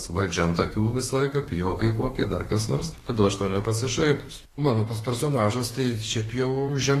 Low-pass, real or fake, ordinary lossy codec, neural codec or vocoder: 14.4 kHz; fake; AAC, 48 kbps; codec, 32 kHz, 1.9 kbps, SNAC